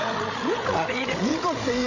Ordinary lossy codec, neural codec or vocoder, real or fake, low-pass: none; codec, 16 kHz, 16 kbps, FreqCodec, larger model; fake; 7.2 kHz